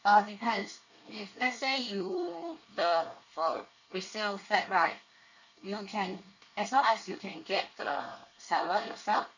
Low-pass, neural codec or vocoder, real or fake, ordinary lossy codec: 7.2 kHz; codec, 24 kHz, 1 kbps, SNAC; fake; none